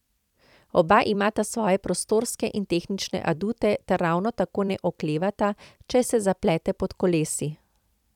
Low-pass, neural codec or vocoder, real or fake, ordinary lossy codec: 19.8 kHz; vocoder, 44.1 kHz, 128 mel bands every 256 samples, BigVGAN v2; fake; none